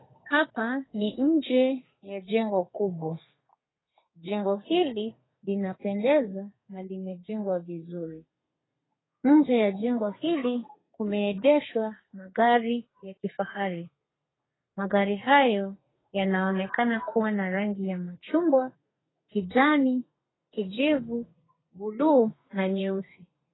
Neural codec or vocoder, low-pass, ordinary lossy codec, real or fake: codec, 32 kHz, 1.9 kbps, SNAC; 7.2 kHz; AAC, 16 kbps; fake